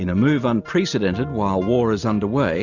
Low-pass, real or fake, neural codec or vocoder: 7.2 kHz; real; none